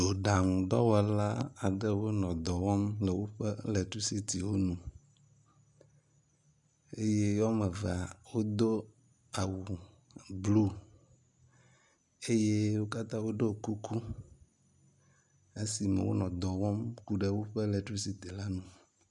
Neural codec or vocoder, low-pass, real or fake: none; 10.8 kHz; real